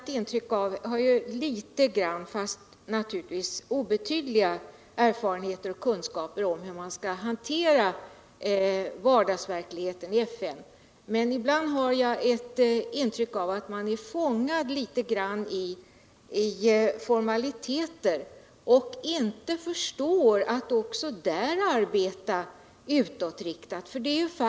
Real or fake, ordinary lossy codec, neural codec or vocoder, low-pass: real; none; none; none